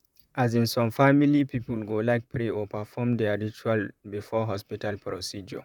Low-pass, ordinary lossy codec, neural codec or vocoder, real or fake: 19.8 kHz; none; vocoder, 44.1 kHz, 128 mel bands, Pupu-Vocoder; fake